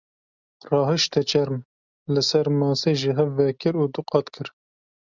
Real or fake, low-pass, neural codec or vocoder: real; 7.2 kHz; none